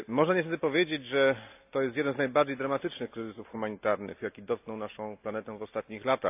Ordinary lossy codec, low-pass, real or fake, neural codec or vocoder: AAC, 32 kbps; 3.6 kHz; real; none